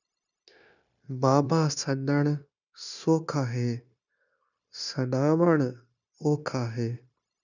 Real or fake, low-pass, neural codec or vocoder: fake; 7.2 kHz; codec, 16 kHz, 0.9 kbps, LongCat-Audio-Codec